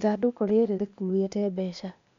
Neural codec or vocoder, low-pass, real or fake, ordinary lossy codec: codec, 16 kHz, 0.8 kbps, ZipCodec; 7.2 kHz; fake; MP3, 64 kbps